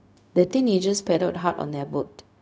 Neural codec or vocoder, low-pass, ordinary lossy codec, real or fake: codec, 16 kHz, 0.4 kbps, LongCat-Audio-Codec; none; none; fake